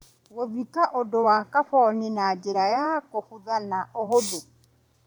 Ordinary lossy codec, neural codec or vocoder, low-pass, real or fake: none; vocoder, 44.1 kHz, 128 mel bands every 512 samples, BigVGAN v2; none; fake